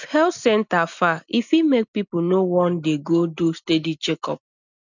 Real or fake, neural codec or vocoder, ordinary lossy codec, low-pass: real; none; none; 7.2 kHz